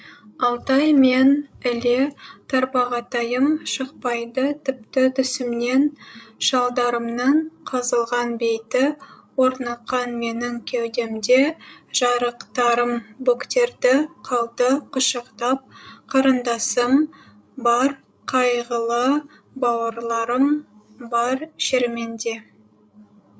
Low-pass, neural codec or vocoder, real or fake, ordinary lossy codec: none; codec, 16 kHz, 16 kbps, FreqCodec, larger model; fake; none